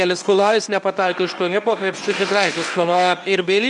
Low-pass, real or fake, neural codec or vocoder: 10.8 kHz; fake; codec, 24 kHz, 0.9 kbps, WavTokenizer, medium speech release version 1